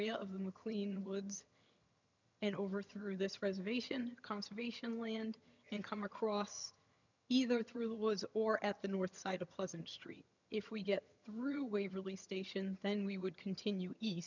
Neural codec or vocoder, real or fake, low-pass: vocoder, 22.05 kHz, 80 mel bands, HiFi-GAN; fake; 7.2 kHz